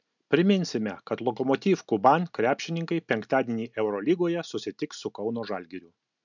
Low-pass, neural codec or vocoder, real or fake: 7.2 kHz; none; real